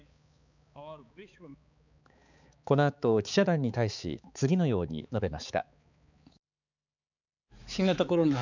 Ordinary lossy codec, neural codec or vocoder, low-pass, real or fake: none; codec, 16 kHz, 4 kbps, X-Codec, HuBERT features, trained on balanced general audio; 7.2 kHz; fake